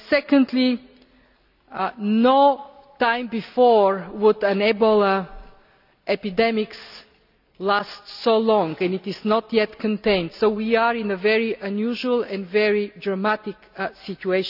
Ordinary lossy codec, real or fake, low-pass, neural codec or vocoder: none; real; 5.4 kHz; none